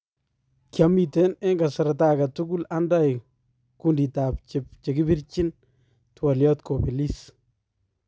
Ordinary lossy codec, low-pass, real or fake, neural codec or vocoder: none; none; real; none